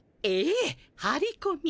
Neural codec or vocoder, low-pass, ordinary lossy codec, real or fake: none; none; none; real